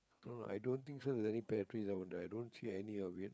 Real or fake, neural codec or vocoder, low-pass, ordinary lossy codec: fake; codec, 16 kHz, 4 kbps, FreqCodec, larger model; none; none